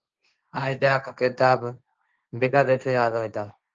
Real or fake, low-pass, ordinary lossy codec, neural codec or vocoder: fake; 7.2 kHz; Opus, 24 kbps; codec, 16 kHz, 1.1 kbps, Voila-Tokenizer